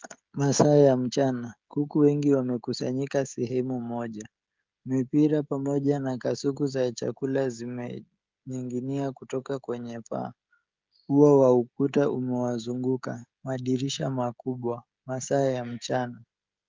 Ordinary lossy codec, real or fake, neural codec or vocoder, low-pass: Opus, 24 kbps; fake; codec, 16 kHz, 16 kbps, FreqCodec, smaller model; 7.2 kHz